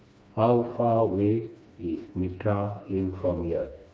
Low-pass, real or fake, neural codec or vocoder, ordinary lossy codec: none; fake; codec, 16 kHz, 2 kbps, FreqCodec, smaller model; none